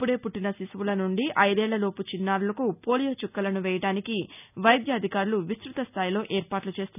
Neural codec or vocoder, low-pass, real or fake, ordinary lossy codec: none; 3.6 kHz; real; none